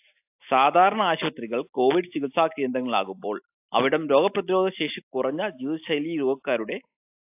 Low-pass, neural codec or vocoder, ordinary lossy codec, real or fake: 3.6 kHz; none; AAC, 32 kbps; real